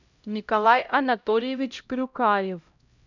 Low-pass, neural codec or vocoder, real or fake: 7.2 kHz; codec, 16 kHz, 0.5 kbps, X-Codec, HuBERT features, trained on LibriSpeech; fake